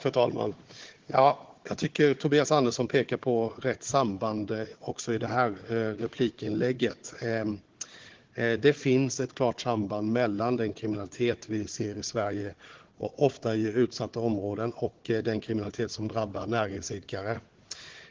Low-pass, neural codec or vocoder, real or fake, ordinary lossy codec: 7.2 kHz; codec, 16 kHz, 4 kbps, FunCodec, trained on Chinese and English, 50 frames a second; fake; Opus, 16 kbps